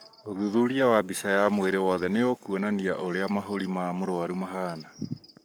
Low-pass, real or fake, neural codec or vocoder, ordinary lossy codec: none; fake; codec, 44.1 kHz, 7.8 kbps, Pupu-Codec; none